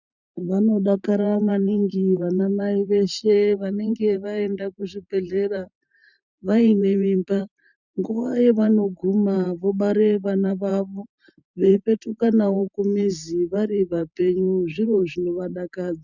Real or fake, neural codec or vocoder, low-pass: fake; vocoder, 44.1 kHz, 128 mel bands every 512 samples, BigVGAN v2; 7.2 kHz